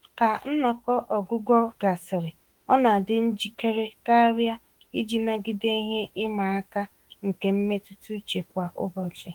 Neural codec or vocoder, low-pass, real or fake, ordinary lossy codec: autoencoder, 48 kHz, 32 numbers a frame, DAC-VAE, trained on Japanese speech; 19.8 kHz; fake; Opus, 24 kbps